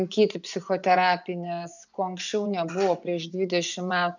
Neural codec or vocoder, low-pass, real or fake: vocoder, 24 kHz, 100 mel bands, Vocos; 7.2 kHz; fake